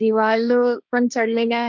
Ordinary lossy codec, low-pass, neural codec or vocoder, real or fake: none; none; codec, 16 kHz, 1.1 kbps, Voila-Tokenizer; fake